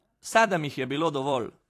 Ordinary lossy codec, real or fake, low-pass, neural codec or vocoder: AAC, 64 kbps; fake; 14.4 kHz; vocoder, 44.1 kHz, 128 mel bands every 256 samples, BigVGAN v2